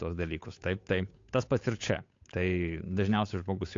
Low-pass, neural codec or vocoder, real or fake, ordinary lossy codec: 7.2 kHz; codec, 16 kHz, 8 kbps, FunCodec, trained on Chinese and English, 25 frames a second; fake; AAC, 48 kbps